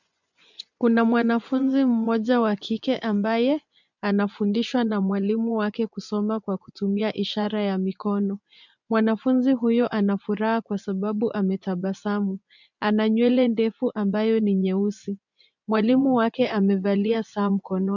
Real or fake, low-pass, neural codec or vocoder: fake; 7.2 kHz; vocoder, 24 kHz, 100 mel bands, Vocos